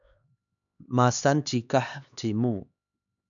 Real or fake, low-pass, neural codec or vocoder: fake; 7.2 kHz; codec, 16 kHz, 2 kbps, X-Codec, HuBERT features, trained on LibriSpeech